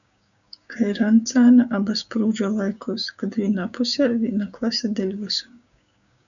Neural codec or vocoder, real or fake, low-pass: codec, 16 kHz, 6 kbps, DAC; fake; 7.2 kHz